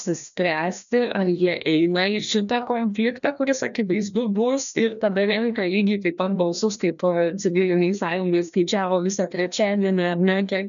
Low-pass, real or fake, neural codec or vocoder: 7.2 kHz; fake; codec, 16 kHz, 1 kbps, FreqCodec, larger model